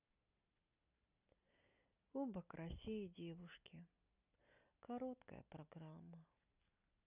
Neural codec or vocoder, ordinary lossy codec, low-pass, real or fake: none; none; 3.6 kHz; real